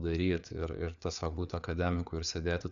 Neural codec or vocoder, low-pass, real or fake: codec, 16 kHz, 4 kbps, FreqCodec, larger model; 7.2 kHz; fake